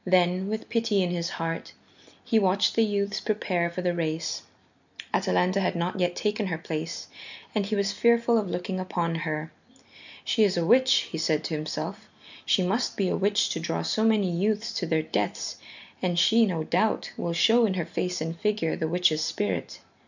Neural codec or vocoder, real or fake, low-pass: none; real; 7.2 kHz